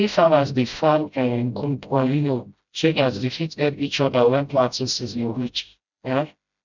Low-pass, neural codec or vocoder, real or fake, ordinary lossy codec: 7.2 kHz; codec, 16 kHz, 0.5 kbps, FreqCodec, smaller model; fake; none